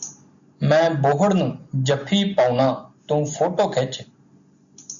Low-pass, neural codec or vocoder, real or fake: 7.2 kHz; none; real